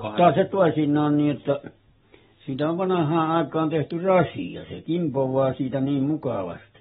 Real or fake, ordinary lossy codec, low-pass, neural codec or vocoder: real; AAC, 16 kbps; 19.8 kHz; none